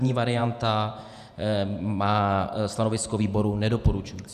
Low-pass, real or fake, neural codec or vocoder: 14.4 kHz; fake; vocoder, 48 kHz, 128 mel bands, Vocos